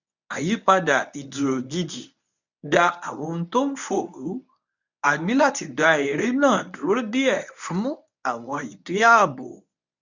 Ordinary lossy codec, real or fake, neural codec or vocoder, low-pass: none; fake; codec, 24 kHz, 0.9 kbps, WavTokenizer, medium speech release version 1; 7.2 kHz